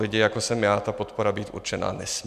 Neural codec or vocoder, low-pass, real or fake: none; 14.4 kHz; real